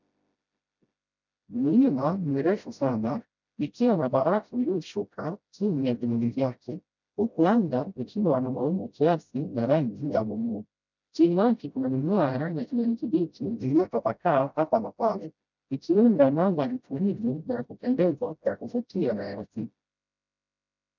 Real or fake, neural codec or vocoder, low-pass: fake; codec, 16 kHz, 0.5 kbps, FreqCodec, smaller model; 7.2 kHz